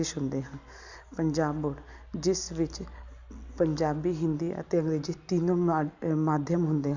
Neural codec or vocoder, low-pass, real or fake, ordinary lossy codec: none; 7.2 kHz; real; none